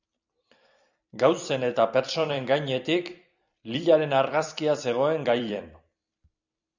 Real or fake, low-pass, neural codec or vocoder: fake; 7.2 kHz; vocoder, 44.1 kHz, 128 mel bands every 256 samples, BigVGAN v2